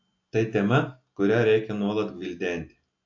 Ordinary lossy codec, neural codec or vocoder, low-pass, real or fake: AAC, 48 kbps; none; 7.2 kHz; real